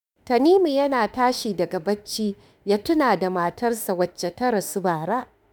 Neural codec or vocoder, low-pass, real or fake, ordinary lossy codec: autoencoder, 48 kHz, 32 numbers a frame, DAC-VAE, trained on Japanese speech; none; fake; none